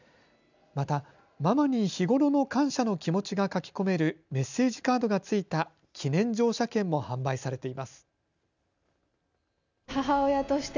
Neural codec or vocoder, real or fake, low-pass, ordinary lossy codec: none; real; 7.2 kHz; none